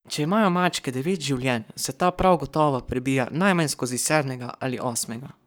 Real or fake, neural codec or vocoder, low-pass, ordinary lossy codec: fake; codec, 44.1 kHz, 7.8 kbps, Pupu-Codec; none; none